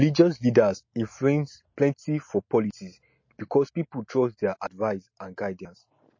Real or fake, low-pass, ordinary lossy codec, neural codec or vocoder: real; 7.2 kHz; MP3, 32 kbps; none